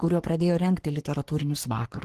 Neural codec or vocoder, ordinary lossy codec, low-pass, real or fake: codec, 44.1 kHz, 3.4 kbps, Pupu-Codec; Opus, 16 kbps; 14.4 kHz; fake